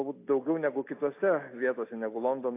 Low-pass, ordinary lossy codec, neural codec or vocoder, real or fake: 3.6 kHz; AAC, 24 kbps; none; real